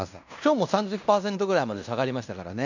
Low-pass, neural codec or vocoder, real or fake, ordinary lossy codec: 7.2 kHz; codec, 16 kHz in and 24 kHz out, 0.9 kbps, LongCat-Audio-Codec, fine tuned four codebook decoder; fake; none